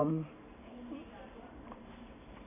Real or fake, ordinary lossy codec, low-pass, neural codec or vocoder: fake; none; 3.6 kHz; vocoder, 44.1 kHz, 128 mel bands every 512 samples, BigVGAN v2